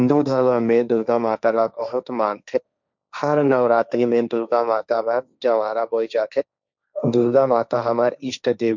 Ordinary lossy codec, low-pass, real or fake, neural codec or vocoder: none; 7.2 kHz; fake; codec, 16 kHz, 1.1 kbps, Voila-Tokenizer